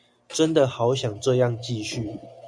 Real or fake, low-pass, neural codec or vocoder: real; 9.9 kHz; none